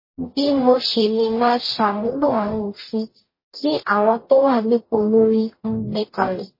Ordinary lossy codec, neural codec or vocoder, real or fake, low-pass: MP3, 24 kbps; codec, 44.1 kHz, 0.9 kbps, DAC; fake; 5.4 kHz